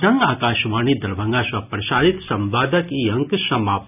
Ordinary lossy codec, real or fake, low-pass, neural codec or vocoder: none; real; 3.6 kHz; none